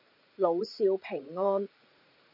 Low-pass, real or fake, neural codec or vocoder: 5.4 kHz; fake; vocoder, 44.1 kHz, 80 mel bands, Vocos